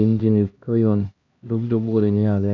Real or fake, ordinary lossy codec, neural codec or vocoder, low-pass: fake; AAC, 48 kbps; codec, 16 kHz, 1 kbps, X-Codec, HuBERT features, trained on LibriSpeech; 7.2 kHz